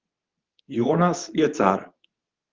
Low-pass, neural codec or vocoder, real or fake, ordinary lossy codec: 7.2 kHz; codec, 24 kHz, 0.9 kbps, WavTokenizer, medium speech release version 2; fake; Opus, 32 kbps